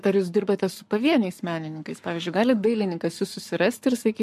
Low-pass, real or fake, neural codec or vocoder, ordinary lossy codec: 14.4 kHz; fake; codec, 44.1 kHz, 7.8 kbps, Pupu-Codec; MP3, 64 kbps